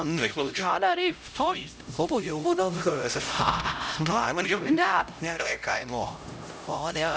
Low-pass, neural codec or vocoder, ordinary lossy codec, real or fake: none; codec, 16 kHz, 0.5 kbps, X-Codec, HuBERT features, trained on LibriSpeech; none; fake